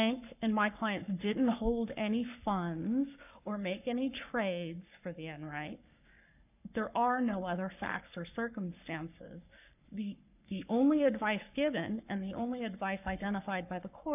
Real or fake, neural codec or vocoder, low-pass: fake; codec, 44.1 kHz, 7.8 kbps, Pupu-Codec; 3.6 kHz